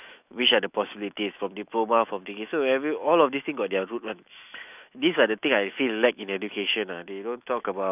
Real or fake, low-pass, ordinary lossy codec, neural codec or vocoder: fake; 3.6 kHz; none; autoencoder, 48 kHz, 128 numbers a frame, DAC-VAE, trained on Japanese speech